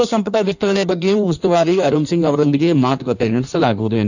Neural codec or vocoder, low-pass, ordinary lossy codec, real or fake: codec, 16 kHz in and 24 kHz out, 1.1 kbps, FireRedTTS-2 codec; 7.2 kHz; none; fake